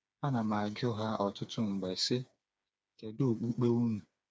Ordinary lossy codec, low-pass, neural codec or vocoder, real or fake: none; none; codec, 16 kHz, 4 kbps, FreqCodec, smaller model; fake